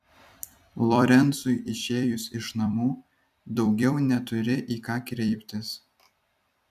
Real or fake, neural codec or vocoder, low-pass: fake; vocoder, 44.1 kHz, 128 mel bands every 256 samples, BigVGAN v2; 14.4 kHz